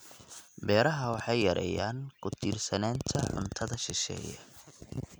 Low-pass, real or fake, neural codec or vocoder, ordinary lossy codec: none; real; none; none